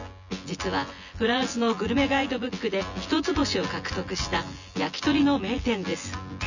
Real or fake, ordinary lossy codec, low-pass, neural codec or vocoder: fake; none; 7.2 kHz; vocoder, 24 kHz, 100 mel bands, Vocos